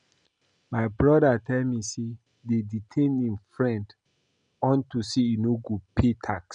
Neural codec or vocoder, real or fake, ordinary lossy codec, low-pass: none; real; none; 9.9 kHz